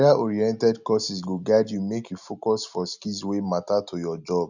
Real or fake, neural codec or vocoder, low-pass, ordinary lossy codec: real; none; 7.2 kHz; none